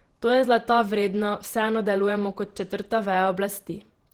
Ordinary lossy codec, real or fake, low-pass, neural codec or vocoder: Opus, 16 kbps; fake; 19.8 kHz; vocoder, 48 kHz, 128 mel bands, Vocos